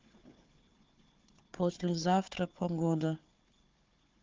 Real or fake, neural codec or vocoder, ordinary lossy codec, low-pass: fake; codec, 16 kHz, 4 kbps, FunCodec, trained on Chinese and English, 50 frames a second; Opus, 24 kbps; 7.2 kHz